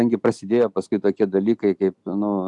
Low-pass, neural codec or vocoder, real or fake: 10.8 kHz; none; real